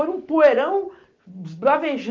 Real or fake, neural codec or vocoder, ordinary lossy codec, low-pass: real; none; Opus, 32 kbps; 7.2 kHz